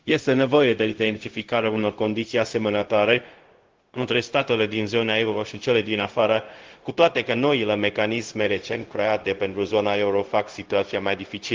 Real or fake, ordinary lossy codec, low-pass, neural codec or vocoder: fake; Opus, 16 kbps; 7.2 kHz; codec, 16 kHz, 0.4 kbps, LongCat-Audio-Codec